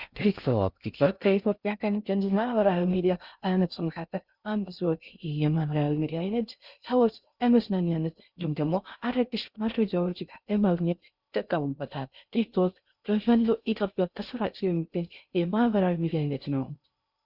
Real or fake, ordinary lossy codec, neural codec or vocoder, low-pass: fake; Opus, 64 kbps; codec, 16 kHz in and 24 kHz out, 0.6 kbps, FocalCodec, streaming, 2048 codes; 5.4 kHz